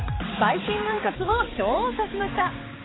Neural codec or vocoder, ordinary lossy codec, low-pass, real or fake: codec, 16 kHz, 4 kbps, X-Codec, HuBERT features, trained on balanced general audio; AAC, 16 kbps; 7.2 kHz; fake